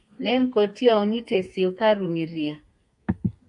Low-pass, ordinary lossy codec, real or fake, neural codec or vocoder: 10.8 kHz; MP3, 64 kbps; fake; codec, 32 kHz, 1.9 kbps, SNAC